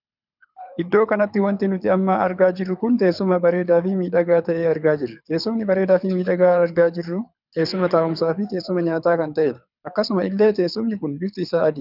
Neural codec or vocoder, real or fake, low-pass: codec, 24 kHz, 6 kbps, HILCodec; fake; 5.4 kHz